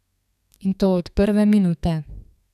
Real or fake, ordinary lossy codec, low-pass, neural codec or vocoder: fake; none; 14.4 kHz; codec, 32 kHz, 1.9 kbps, SNAC